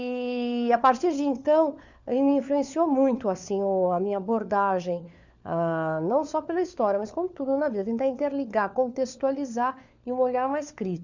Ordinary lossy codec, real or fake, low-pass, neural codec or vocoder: none; fake; 7.2 kHz; codec, 16 kHz, 4 kbps, FunCodec, trained on LibriTTS, 50 frames a second